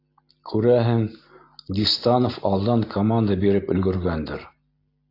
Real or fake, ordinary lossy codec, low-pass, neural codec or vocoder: real; AAC, 32 kbps; 5.4 kHz; none